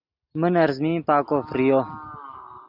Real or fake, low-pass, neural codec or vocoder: real; 5.4 kHz; none